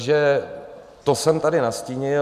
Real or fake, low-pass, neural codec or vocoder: real; 14.4 kHz; none